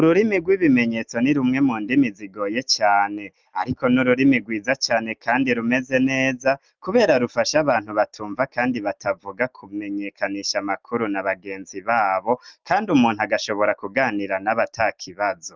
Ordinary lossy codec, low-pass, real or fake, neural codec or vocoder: Opus, 24 kbps; 7.2 kHz; real; none